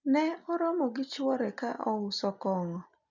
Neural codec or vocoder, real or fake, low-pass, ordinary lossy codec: none; real; 7.2 kHz; none